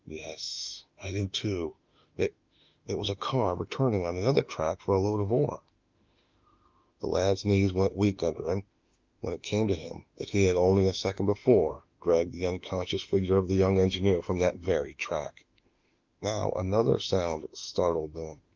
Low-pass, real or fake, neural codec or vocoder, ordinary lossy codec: 7.2 kHz; fake; autoencoder, 48 kHz, 32 numbers a frame, DAC-VAE, trained on Japanese speech; Opus, 24 kbps